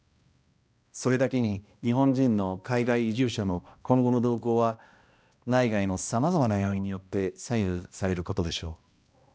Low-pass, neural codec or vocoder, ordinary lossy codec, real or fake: none; codec, 16 kHz, 1 kbps, X-Codec, HuBERT features, trained on balanced general audio; none; fake